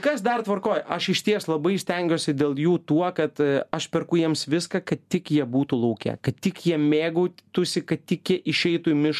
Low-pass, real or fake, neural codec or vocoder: 14.4 kHz; real; none